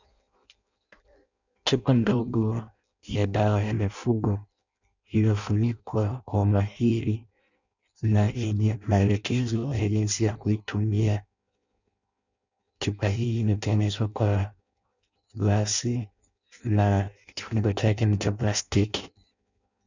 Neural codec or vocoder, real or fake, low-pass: codec, 16 kHz in and 24 kHz out, 0.6 kbps, FireRedTTS-2 codec; fake; 7.2 kHz